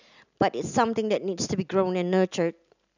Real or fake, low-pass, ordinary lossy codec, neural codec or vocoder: real; 7.2 kHz; none; none